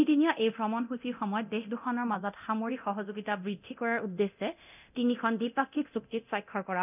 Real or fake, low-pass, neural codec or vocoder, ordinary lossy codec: fake; 3.6 kHz; codec, 24 kHz, 0.9 kbps, DualCodec; none